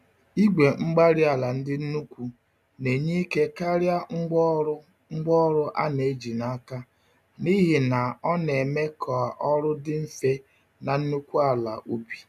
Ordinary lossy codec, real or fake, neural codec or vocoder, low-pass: none; real; none; 14.4 kHz